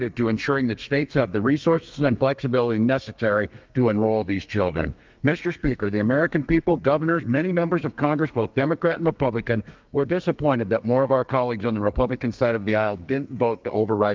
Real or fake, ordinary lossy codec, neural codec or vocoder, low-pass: fake; Opus, 24 kbps; codec, 32 kHz, 1.9 kbps, SNAC; 7.2 kHz